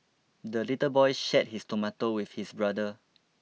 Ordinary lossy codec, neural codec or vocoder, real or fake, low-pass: none; none; real; none